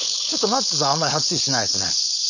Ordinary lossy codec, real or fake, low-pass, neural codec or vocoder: none; fake; 7.2 kHz; codec, 16 kHz, 4.8 kbps, FACodec